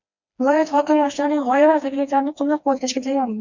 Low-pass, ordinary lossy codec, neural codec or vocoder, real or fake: 7.2 kHz; MP3, 64 kbps; codec, 16 kHz, 2 kbps, FreqCodec, smaller model; fake